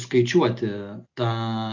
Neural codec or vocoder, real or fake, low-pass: none; real; 7.2 kHz